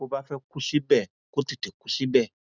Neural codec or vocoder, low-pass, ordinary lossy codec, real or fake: none; 7.2 kHz; none; real